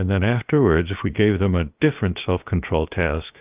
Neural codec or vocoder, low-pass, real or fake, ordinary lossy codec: codec, 16 kHz, about 1 kbps, DyCAST, with the encoder's durations; 3.6 kHz; fake; Opus, 24 kbps